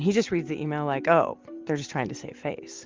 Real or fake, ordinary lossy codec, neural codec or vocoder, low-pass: real; Opus, 32 kbps; none; 7.2 kHz